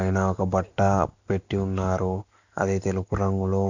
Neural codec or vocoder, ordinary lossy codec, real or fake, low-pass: none; none; real; 7.2 kHz